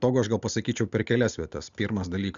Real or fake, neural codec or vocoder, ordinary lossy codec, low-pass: real; none; Opus, 64 kbps; 7.2 kHz